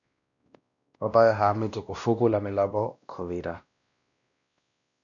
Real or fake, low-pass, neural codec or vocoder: fake; 7.2 kHz; codec, 16 kHz, 1 kbps, X-Codec, WavLM features, trained on Multilingual LibriSpeech